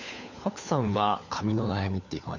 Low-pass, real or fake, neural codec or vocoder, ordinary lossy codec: 7.2 kHz; fake; codec, 16 kHz, 4 kbps, FunCodec, trained on LibriTTS, 50 frames a second; none